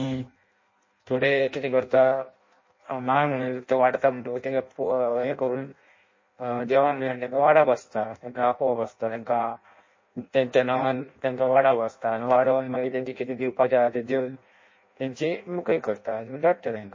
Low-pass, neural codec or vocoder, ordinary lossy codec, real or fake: 7.2 kHz; codec, 16 kHz in and 24 kHz out, 0.6 kbps, FireRedTTS-2 codec; MP3, 32 kbps; fake